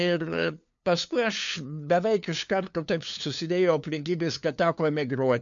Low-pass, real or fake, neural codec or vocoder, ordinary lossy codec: 7.2 kHz; fake; codec, 16 kHz, 2 kbps, FunCodec, trained on LibriTTS, 25 frames a second; MP3, 64 kbps